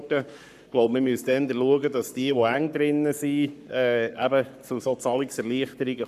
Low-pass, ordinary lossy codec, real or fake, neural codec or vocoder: 14.4 kHz; none; fake; codec, 44.1 kHz, 7.8 kbps, Pupu-Codec